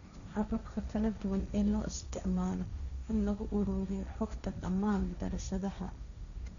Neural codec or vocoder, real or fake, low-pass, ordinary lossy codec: codec, 16 kHz, 1.1 kbps, Voila-Tokenizer; fake; 7.2 kHz; none